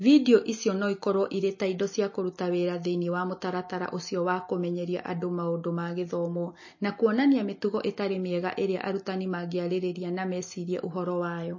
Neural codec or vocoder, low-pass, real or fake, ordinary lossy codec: none; 7.2 kHz; real; MP3, 32 kbps